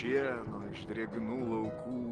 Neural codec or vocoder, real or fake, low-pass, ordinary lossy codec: none; real; 9.9 kHz; Opus, 16 kbps